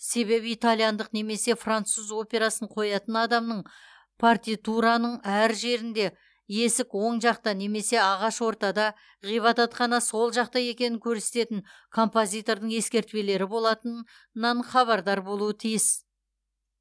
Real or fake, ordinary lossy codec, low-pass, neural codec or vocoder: real; none; none; none